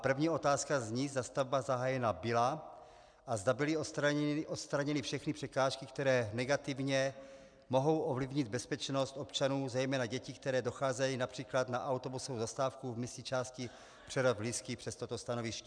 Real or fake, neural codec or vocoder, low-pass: real; none; 9.9 kHz